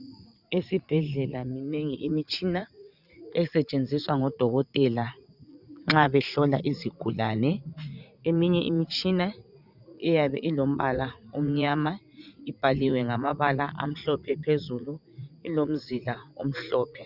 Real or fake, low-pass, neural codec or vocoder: fake; 5.4 kHz; vocoder, 44.1 kHz, 80 mel bands, Vocos